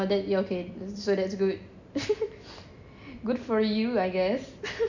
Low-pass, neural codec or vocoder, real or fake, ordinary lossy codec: 7.2 kHz; none; real; none